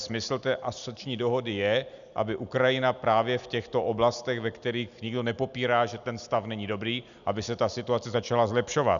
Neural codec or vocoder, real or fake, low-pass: none; real; 7.2 kHz